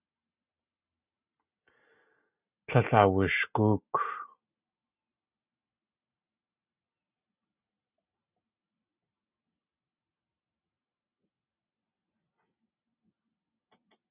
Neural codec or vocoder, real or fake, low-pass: none; real; 3.6 kHz